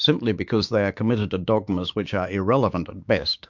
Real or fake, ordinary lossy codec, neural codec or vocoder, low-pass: fake; MP3, 64 kbps; codec, 16 kHz, 6 kbps, DAC; 7.2 kHz